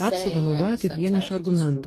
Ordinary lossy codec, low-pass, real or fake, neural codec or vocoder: AAC, 48 kbps; 14.4 kHz; fake; codec, 44.1 kHz, 2.6 kbps, DAC